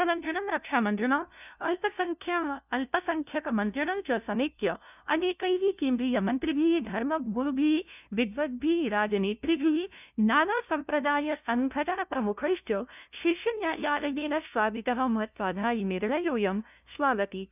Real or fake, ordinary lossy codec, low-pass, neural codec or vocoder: fake; none; 3.6 kHz; codec, 16 kHz, 0.5 kbps, FunCodec, trained on LibriTTS, 25 frames a second